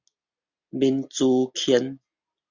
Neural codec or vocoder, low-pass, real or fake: none; 7.2 kHz; real